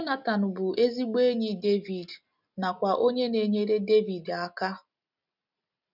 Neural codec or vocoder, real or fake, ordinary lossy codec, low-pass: none; real; none; 5.4 kHz